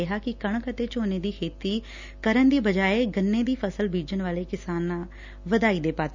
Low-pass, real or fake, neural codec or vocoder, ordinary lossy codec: 7.2 kHz; real; none; none